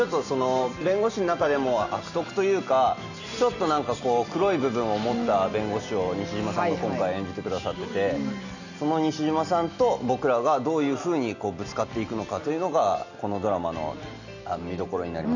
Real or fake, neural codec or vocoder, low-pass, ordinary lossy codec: real; none; 7.2 kHz; none